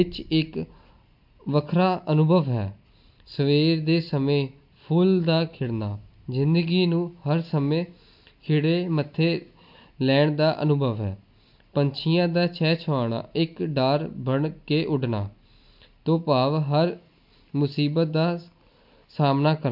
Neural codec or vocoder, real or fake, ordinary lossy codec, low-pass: none; real; MP3, 48 kbps; 5.4 kHz